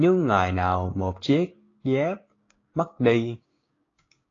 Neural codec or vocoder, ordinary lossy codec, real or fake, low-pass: codec, 16 kHz, 6 kbps, DAC; AAC, 32 kbps; fake; 7.2 kHz